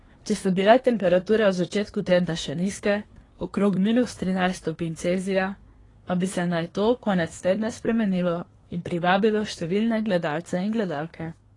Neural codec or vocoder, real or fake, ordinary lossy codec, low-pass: codec, 24 kHz, 1 kbps, SNAC; fake; AAC, 32 kbps; 10.8 kHz